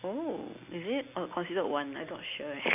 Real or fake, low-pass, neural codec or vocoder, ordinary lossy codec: real; 3.6 kHz; none; none